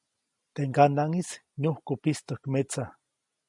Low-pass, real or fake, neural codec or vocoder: 10.8 kHz; real; none